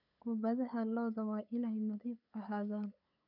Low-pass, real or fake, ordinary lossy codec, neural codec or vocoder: 5.4 kHz; fake; none; codec, 16 kHz, 8 kbps, FunCodec, trained on LibriTTS, 25 frames a second